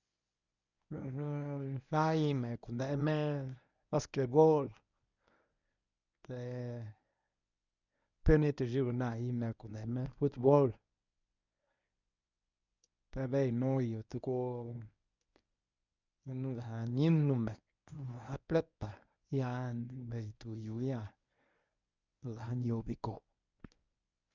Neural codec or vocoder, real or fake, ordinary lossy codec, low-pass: codec, 24 kHz, 0.9 kbps, WavTokenizer, medium speech release version 1; fake; none; 7.2 kHz